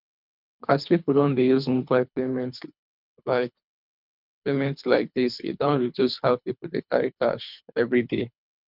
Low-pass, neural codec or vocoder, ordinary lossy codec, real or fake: 5.4 kHz; codec, 24 kHz, 3 kbps, HILCodec; none; fake